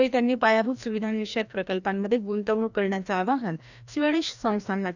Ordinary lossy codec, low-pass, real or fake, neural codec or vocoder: none; 7.2 kHz; fake; codec, 16 kHz, 1 kbps, FreqCodec, larger model